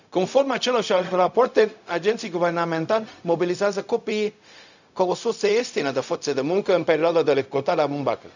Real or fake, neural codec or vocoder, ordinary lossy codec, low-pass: fake; codec, 16 kHz, 0.4 kbps, LongCat-Audio-Codec; none; 7.2 kHz